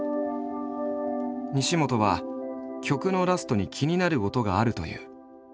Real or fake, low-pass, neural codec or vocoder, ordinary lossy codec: real; none; none; none